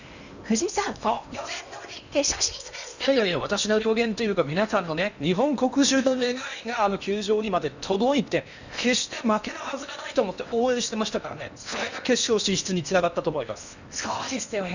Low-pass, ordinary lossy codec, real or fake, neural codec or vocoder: 7.2 kHz; none; fake; codec, 16 kHz in and 24 kHz out, 0.8 kbps, FocalCodec, streaming, 65536 codes